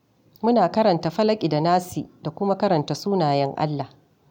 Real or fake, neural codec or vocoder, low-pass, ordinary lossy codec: real; none; 19.8 kHz; none